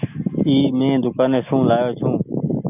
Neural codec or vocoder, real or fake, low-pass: none; real; 3.6 kHz